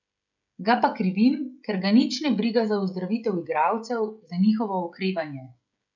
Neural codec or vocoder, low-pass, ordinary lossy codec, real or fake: codec, 16 kHz, 16 kbps, FreqCodec, smaller model; 7.2 kHz; none; fake